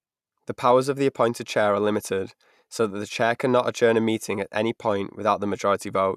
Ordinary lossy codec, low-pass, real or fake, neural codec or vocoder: none; 14.4 kHz; real; none